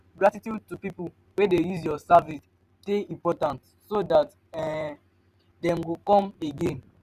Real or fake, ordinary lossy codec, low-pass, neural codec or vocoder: fake; none; 14.4 kHz; vocoder, 44.1 kHz, 128 mel bands every 512 samples, BigVGAN v2